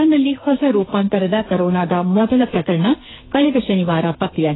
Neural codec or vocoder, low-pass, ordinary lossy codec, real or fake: codec, 44.1 kHz, 2.6 kbps, SNAC; 7.2 kHz; AAC, 16 kbps; fake